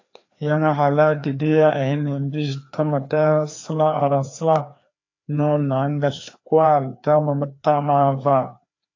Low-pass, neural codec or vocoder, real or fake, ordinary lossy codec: 7.2 kHz; codec, 16 kHz, 2 kbps, FreqCodec, larger model; fake; AAC, 48 kbps